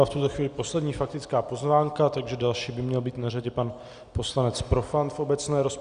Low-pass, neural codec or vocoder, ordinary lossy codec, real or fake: 9.9 kHz; none; Opus, 64 kbps; real